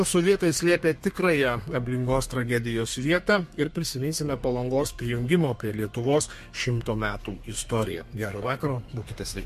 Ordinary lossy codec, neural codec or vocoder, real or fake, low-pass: MP3, 64 kbps; codec, 32 kHz, 1.9 kbps, SNAC; fake; 14.4 kHz